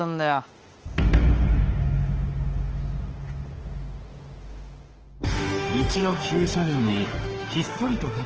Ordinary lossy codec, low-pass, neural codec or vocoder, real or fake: Opus, 16 kbps; 7.2 kHz; autoencoder, 48 kHz, 32 numbers a frame, DAC-VAE, trained on Japanese speech; fake